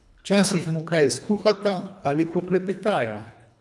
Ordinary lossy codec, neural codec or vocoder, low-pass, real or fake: none; codec, 24 kHz, 1.5 kbps, HILCodec; none; fake